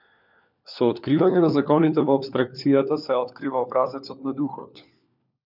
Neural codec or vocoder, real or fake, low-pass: codec, 16 kHz, 4 kbps, FunCodec, trained on LibriTTS, 50 frames a second; fake; 5.4 kHz